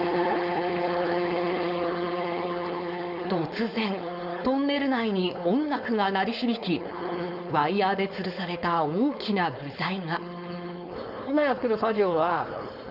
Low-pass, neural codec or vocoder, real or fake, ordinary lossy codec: 5.4 kHz; codec, 16 kHz, 4.8 kbps, FACodec; fake; none